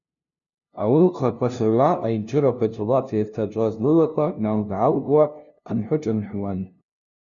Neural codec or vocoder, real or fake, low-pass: codec, 16 kHz, 0.5 kbps, FunCodec, trained on LibriTTS, 25 frames a second; fake; 7.2 kHz